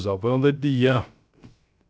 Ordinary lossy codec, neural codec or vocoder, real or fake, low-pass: none; codec, 16 kHz, 0.3 kbps, FocalCodec; fake; none